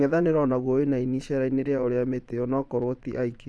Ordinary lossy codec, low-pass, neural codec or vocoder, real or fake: none; none; vocoder, 22.05 kHz, 80 mel bands, Vocos; fake